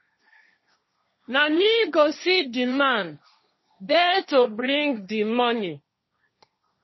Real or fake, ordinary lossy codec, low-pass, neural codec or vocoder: fake; MP3, 24 kbps; 7.2 kHz; codec, 16 kHz, 1.1 kbps, Voila-Tokenizer